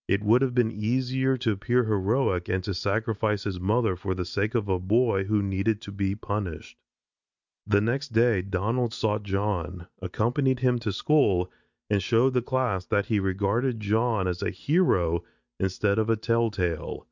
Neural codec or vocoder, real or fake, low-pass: none; real; 7.2 kHz